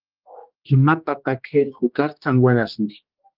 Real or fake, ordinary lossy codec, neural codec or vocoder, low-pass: fake; Opus, 32 kbps; codec, 16 kHz, 1 kbps, X-Codec, HuBERT features, trained on general audio; 5.4 kHz